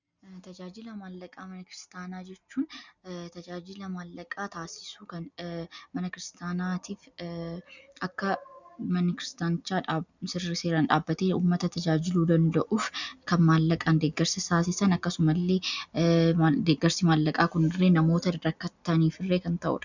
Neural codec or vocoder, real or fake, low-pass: none; real; 7.2 kHz